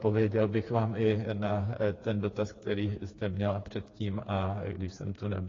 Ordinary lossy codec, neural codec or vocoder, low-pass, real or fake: AAC, 32 kbps; codec, 16 kHz, 4 kbps, FreqCodec, smaller model; 7.2 kHz; fake